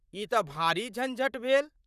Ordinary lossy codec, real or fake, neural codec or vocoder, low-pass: none; fake; vocoder, 44.1 kHz, 128 mel bands, Pupu-Vocoder; 14.4 kHz